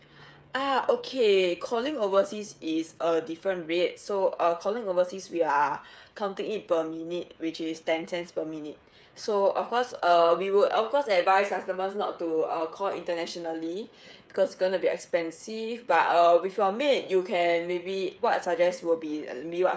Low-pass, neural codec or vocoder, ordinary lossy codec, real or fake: none; codec, 16 kHz, 8 kbps, FreqCodec, smaller model; none; fake